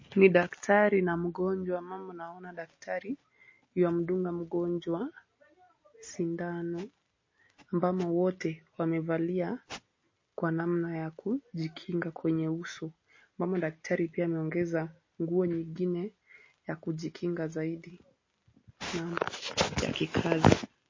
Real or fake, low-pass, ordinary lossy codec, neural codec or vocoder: real; 7.2 kHz; MP3, 32 kbps; none